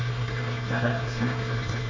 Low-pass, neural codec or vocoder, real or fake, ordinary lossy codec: 7.2 kHz; codec, 24 kHz, 1 kbps, SNAC; fake; MP3, 64 kbps